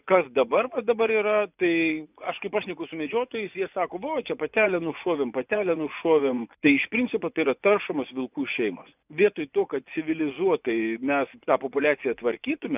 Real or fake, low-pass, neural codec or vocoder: fake; 3.6 kHz; vocoder, 24 kHz, 100 mel bands, Vocos